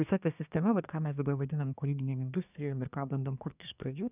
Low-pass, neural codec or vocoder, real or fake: 3.6 kHz; codec, 24 kHz, 1 kbps, SNAC; fake